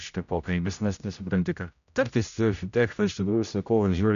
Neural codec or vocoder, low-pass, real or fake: codec, 16 kHz, 0.5 kbps, X-Codec, HuBERT features, trained on general audio; 7.2 kHz; fake